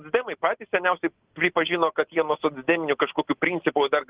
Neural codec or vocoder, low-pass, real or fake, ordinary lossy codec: none; 3.6 kHz; real; Opus, 16 kbps